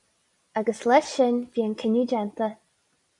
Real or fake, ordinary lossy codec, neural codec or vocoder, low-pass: real; MP3, 96 kbps; none; 10.8 kHz